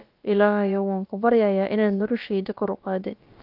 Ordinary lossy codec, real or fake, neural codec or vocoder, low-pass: Opus, 24 kbps; fake; codec, 16 kHz, about 1 kbps, DyCAST, with the encoder's durations; 5.4 kHz